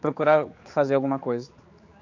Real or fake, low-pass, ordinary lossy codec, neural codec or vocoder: fake; 7.2 kHz; AAC, 48 kbps; codec, 16 kHz, 4 kbps, X-Codec, HuBERT features, trained on general audio